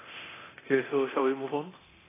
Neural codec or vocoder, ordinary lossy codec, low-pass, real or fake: codec, 24 kHz, 0.9 kbps, DualCodec; AAC, 16 kbps; 3.6 kHz; fake